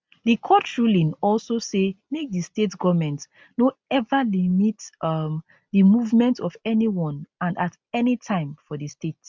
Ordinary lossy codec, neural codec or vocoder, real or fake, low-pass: none; none; real; none